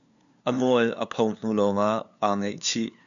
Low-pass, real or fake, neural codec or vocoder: 7.2 kHz; fake; codec, 16 kHz, 2 kbps, FunCodec, trained on LibriTTS, 25 frames a second